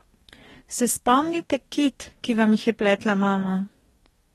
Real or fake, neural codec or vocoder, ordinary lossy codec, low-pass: fake; codec, 44.1 kHz, 2.6 kbps, DAC; AAC, 32 kbps; 19.8 kHz